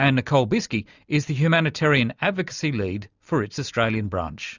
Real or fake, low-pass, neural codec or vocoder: real; 7.2 kHz; none